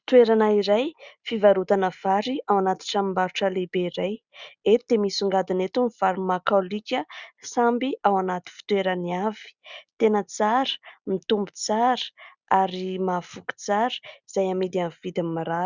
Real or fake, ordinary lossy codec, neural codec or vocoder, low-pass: real; Opus, 64 kbps; none; 7.2 kHz